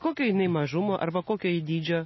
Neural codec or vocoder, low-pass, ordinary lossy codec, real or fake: none; 7.2 kHz; MP3, 24 kbps; real